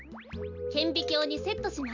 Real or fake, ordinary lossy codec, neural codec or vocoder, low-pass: real; MP3, 64 kbps; none; 7.2 kHz